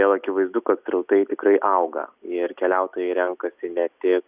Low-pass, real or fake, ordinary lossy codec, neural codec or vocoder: 3.6 kHz; real; Opus, 64 kbps; none